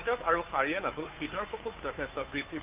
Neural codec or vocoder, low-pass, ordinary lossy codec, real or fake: codec, 16 kHz, 2 kbps, FunCodec, trained on Chinese and English, 25 frames a second; 3.6 kHz; Opus, 24 kbps; fake